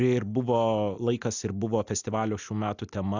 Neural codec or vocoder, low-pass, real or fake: none; 7.2 kHz; real